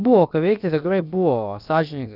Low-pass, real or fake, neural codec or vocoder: 5.4 kHz; fake; codec, 16 kHz, about 1 kbps, DyCAST, with the encoder's durations